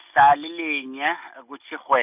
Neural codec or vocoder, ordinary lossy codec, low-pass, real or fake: none; none; 3.6 kHz; real